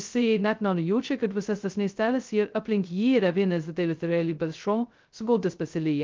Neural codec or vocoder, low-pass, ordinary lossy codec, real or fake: codec, 16 kHz, 0.2 kbps, FocalCodec; 7.2 kHz; Opus, 24 kbps; fake